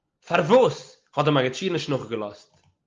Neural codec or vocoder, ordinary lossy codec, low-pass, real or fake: none; Opus, 24 kbps; 7.2 kHz; real